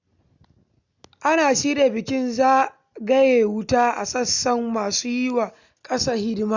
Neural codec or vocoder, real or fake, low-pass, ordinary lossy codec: none; real; 7.2 kHz; none